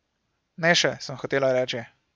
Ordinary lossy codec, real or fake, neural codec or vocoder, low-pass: none; real; none; none